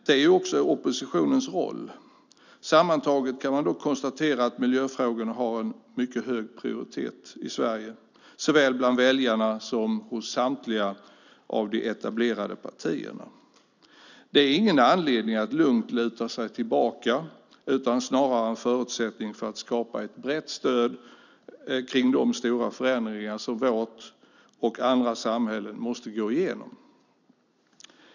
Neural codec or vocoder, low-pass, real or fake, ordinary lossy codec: none; 7.2 kHz; real; none